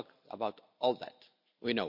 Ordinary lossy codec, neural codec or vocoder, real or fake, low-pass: none; none; real; 5.4 kHz